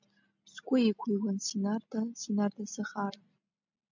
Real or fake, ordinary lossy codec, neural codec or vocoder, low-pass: real; MP3, 64 kbps; none; 7.2 kHz